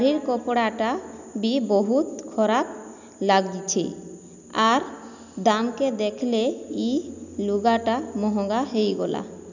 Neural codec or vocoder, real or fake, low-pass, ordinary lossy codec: none; real; 7.2 kHz; none